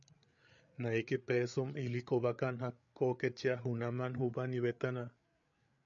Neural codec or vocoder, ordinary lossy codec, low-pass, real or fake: codec, 16 kHz, 8 kbps, FreqCodec, larger model; MP3, 64 kbps; 7.2 kHz; fake